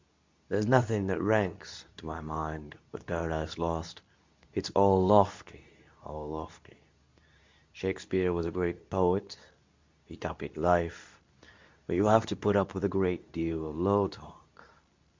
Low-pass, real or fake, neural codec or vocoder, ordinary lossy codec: 7.2 kHz; fake; codec, 24 kHz, 0.9 kbps, WavTokenizer, medium speech release version 2; MP3, 64 kbps